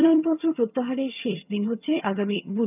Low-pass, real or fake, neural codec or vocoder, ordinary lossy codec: 3.6 kHz; fake; vocoder, 22.05 kHz, 80 mel bands, HiFi-GAN; none